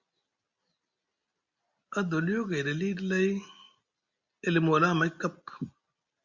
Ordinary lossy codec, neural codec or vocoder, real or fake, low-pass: Opus, 64 kbps; none; real; 7.2 kHz